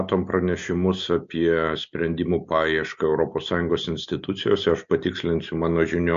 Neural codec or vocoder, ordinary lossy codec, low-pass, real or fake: none; MP3, 48 kbps; 7.2 kHz; real